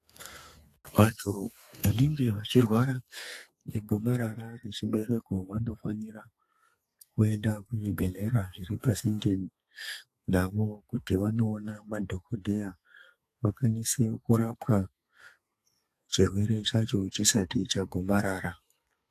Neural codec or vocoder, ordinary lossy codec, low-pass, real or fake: codec, 32 kHz, 1.9 kbps, SNAC; AAC, 64 kbps; 14.4 kHz; fake